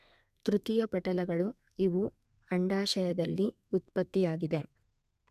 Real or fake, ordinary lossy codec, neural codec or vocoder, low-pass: fake; none; codec, 32 kHz, 1.9 kbps, SNAC; 14.4 kHz